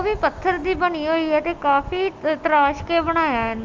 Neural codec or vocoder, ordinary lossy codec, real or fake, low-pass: none; Opus, 32 kbps; real; 7.2 kHz